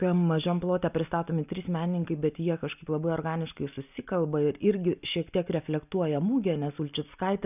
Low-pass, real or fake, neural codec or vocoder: 3.6 kHz; real; none